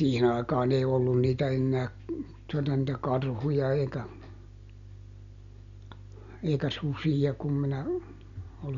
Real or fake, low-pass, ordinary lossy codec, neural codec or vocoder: real; 7.2 kHz; none; none